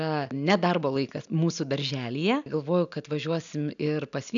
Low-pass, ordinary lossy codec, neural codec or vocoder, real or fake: 7.2 kHz; MP3, 96 kbps; none; real